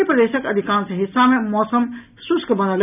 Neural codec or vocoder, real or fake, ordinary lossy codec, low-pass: none; real; none; 3.6 kHz